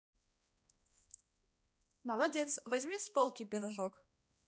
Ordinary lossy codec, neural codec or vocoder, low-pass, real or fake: none; codec, 16 kHz, 1 kbps, X-Codec, HuBERT features, trained on balanced general audio; none; fake